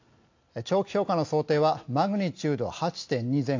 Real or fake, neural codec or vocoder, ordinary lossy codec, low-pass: real; none; AAC, 48 kbps; 7.2 kHz